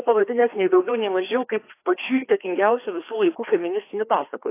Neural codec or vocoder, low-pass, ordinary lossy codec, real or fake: codec, 16 kHz, 2 kbps, FreqCodec, larger model; 3.6 kHz; AAC, 24 kbps; fake